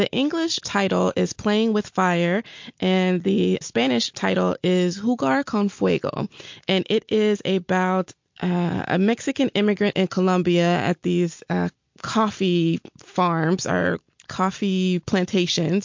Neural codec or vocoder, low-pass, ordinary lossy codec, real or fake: none; 7.2 kHz; MP3, 48 kbps; real